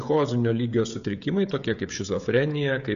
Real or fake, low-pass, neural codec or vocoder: fake; 7.2 kHz; codec, 16 kHz, 16 kbps, FreqCodec, smaller model